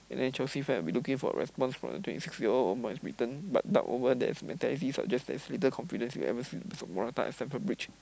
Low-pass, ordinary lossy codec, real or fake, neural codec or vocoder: none; none; real; none